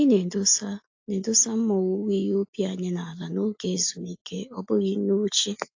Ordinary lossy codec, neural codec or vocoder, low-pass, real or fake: AAC, 48 kbps; none; 7.2 kHz; real